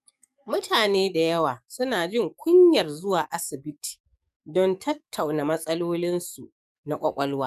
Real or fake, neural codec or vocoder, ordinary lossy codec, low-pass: fake; codec, 44.1 kHz, 7.8 kbps, DAC; none; 14.4 kHz